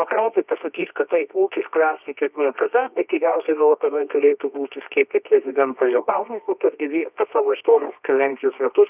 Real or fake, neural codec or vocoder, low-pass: fake; codec, 24 kHz, 0.9 kbps, WavTokenizer, medium music audio release; 3.6 kHz